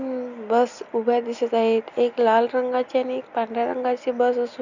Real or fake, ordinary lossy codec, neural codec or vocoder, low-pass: real; none; none; 7.2 kHz